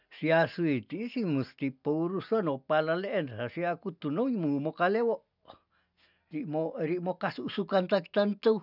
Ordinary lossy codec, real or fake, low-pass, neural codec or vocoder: none; real; 5.4 kHz; none